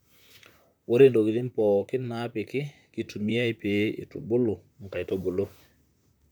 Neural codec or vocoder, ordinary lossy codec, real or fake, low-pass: vocoder, 44.1 kHz, 128 mel bands, Pupu-Vocoder; none; fake; none